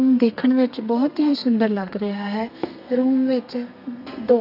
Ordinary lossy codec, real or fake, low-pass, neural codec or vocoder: none; fake; 5.4 kHz; codec, 32 kHz, 1.9 kbps, SNAC